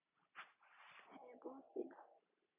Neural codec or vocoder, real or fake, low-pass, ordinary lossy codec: none; real; 3.6 kHz; AAC, 16 kbps